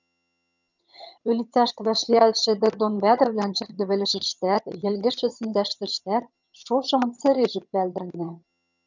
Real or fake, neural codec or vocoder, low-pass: fake; vocoder, 22.05 kHz, 80 mel bands, HiFi-GAN; 7.2 kHz